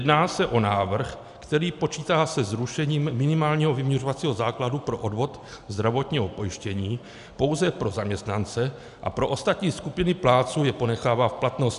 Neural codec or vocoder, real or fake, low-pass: none; real; 10.8 kHz